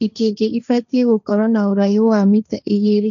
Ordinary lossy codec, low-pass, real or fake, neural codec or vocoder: none; 7.2 kHz; fake; codec, 16 kHz, 1.1 kbps, Voila-Tokenizer